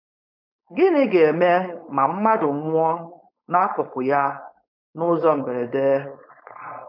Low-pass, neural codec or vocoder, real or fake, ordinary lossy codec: 5.4 kHz; codec, 16 kHz, 4.8 kbps, FACodec; fake; MP3, 48 kbps